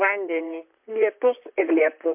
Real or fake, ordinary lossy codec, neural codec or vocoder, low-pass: fake; MP3, 32 kbps; vocoder, 44.1 kHz, 128 mel bands, Pupu-Vocoder; 3.6 kHz